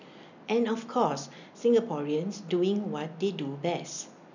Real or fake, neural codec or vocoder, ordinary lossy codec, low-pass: real; none; none; 7.2 kHz